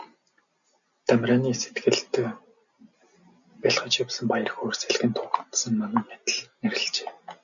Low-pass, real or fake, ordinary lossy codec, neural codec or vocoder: 7.2 kHz; real; MP3, 96 kbps; none